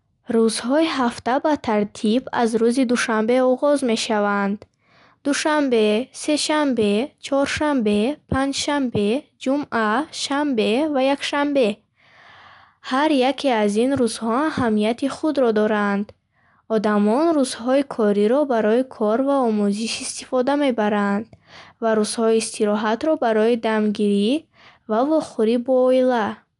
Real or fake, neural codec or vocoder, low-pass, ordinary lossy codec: real; none; 10.8 kHz; none